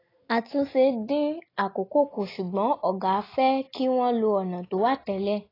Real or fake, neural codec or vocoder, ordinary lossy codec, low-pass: real; none; AAC, 24 kbps; 5.4 kHz